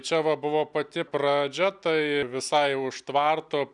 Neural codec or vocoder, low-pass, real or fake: none; 10.8 kHz; real